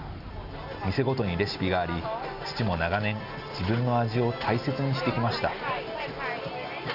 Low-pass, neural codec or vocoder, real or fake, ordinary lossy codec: 5.4 kHz; none; real; none